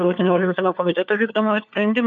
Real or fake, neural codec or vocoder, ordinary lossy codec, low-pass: fake; codec, 16 kHz, 4 kbps, FunCodec, trained on LibriTTS, 50 frames a second; MP3, 64 kbps; 7.2 kHz